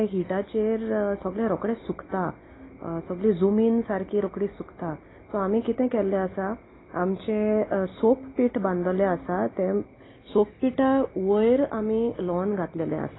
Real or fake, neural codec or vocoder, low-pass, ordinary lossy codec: real; none; 7.2 kHz; AAC, 16 kbps